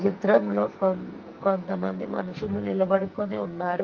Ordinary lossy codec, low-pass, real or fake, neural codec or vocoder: Opus, 24 kbps; 7.2 kHz; fake; codec, 44.1 kHz, 2.6 kbps, SNAC